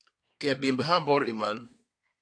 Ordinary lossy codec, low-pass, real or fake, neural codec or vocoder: AAC, 48 kbps; 9.9 kHz; fake; codec, 24 kHz, 1 kbps, SNAC